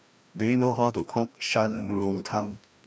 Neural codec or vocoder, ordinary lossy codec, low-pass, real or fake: codec, 16 kHz, 1 kbps, FreqCodec, larger model; none; none; fake